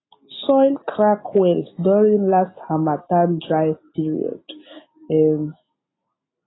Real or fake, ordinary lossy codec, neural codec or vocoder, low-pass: real; AAC, 16 kbps; none; 7.2 kHz